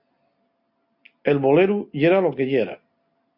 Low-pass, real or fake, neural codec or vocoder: 5.4 kHz; real; none